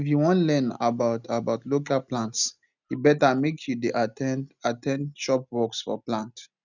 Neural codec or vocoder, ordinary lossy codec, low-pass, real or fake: none; none; 7.2 kHz; real